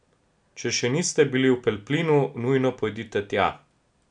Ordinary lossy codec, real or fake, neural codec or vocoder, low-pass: none; real; none; 9.9 kHz